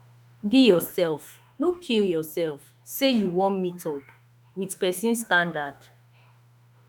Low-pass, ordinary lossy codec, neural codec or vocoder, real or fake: none; none; autoencoder, 48 kHz, 32 numbers a frame, DAC-VAE, trained on Japanese speech; fake